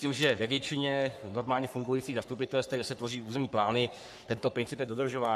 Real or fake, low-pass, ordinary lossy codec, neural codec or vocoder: fake; 14.4 kHz; AAC, 96 kbps; codec, 44.1 kHz, 3.4 kbps, Pupu-Codec